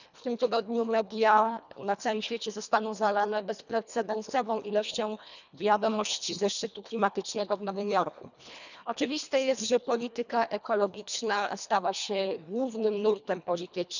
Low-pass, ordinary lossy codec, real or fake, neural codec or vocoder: 7.2 kHz; none; fake; codec, 24 kHz, 1.5 kbps, HILCodec